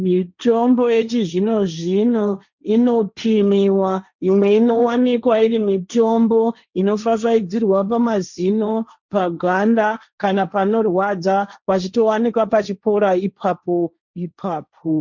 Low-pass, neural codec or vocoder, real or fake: 7.2 kHz; codec, 16 kHz, 1.1 kbps, Voila-Tokenizer; fake